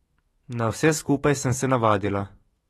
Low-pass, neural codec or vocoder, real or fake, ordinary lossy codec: 19.8 kHz; autoencoder, 48 kHz, 128 numbers a frame, DAC-VAE, trained on Japanese speech; fake; AAC, 32 kbps